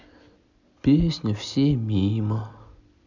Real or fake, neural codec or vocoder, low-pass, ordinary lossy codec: real; none; 7.2 kHz; none